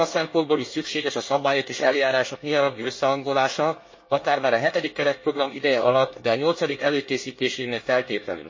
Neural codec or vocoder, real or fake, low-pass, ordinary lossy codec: codec, 24 kHz, 1 kbps, SNAC; fake; 7.2 kHz; MP3, 32 kbps